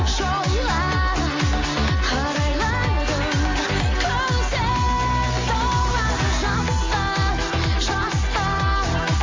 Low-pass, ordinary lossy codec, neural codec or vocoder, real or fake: 7.2 kHz; AAC, 32 kbps; none; real